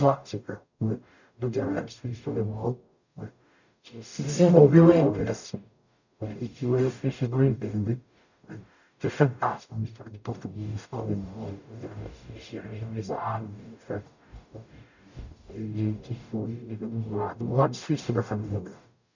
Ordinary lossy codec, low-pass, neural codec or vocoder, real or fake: none; 7.2 kHz; codec, 44.1 kHz, 0.9 kbps, DAC; fake